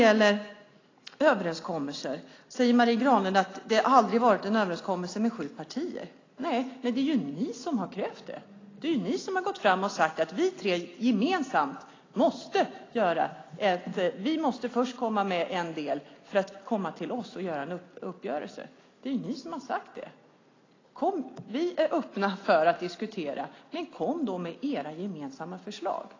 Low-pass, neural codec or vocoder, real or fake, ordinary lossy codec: 7.2 kHz; none; real; AAC, 32 kbps